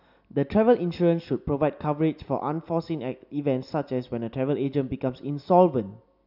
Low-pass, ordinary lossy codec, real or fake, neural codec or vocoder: 5.4 kHz; none; real; none